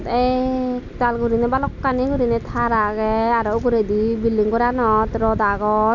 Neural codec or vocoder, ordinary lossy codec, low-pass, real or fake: none; none; 7.2 kHz; real